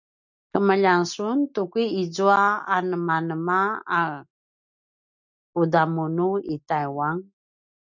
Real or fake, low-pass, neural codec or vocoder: real; 7.2 kHz; none